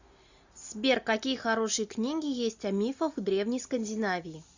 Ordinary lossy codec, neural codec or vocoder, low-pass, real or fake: Opus, 64 kbps; none; 7.2 kHz; real